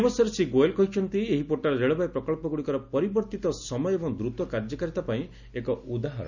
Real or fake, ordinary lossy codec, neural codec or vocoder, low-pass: real; none; none; 7.2 kHz